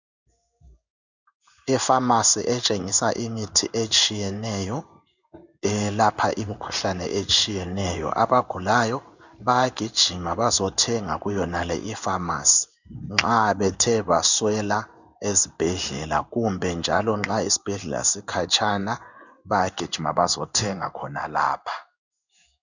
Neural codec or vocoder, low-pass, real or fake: codec, 16 kHz in and 24 kHz out, 1 kbps, XY-Tokenizer; 7.2 kHz; fake